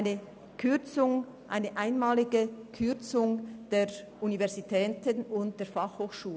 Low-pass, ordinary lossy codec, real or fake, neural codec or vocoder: none; none; real; none